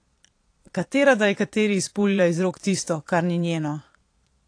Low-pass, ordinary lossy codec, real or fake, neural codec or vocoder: 9.9 kHz; AAC, 48 kbps; fake; vocoder, 22.05 kHz, 80 mel bands, Vocos